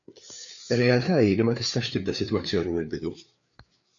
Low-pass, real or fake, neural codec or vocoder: 7.2 kHz; fake; codec, 16 kHz, 4 kbps, FreqCodec, larger model